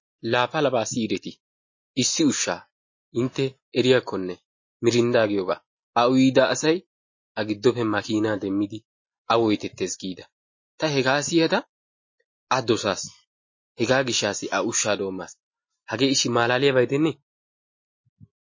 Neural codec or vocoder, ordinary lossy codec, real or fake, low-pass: none; MP3, 32 kbps; real; 7.2 kHz